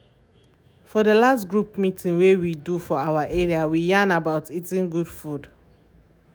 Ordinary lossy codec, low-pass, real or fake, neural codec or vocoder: none; none; fake; autoencoder, 48 kHz, 128 numbers a frame, DAC-VAE, trained on Japanese speech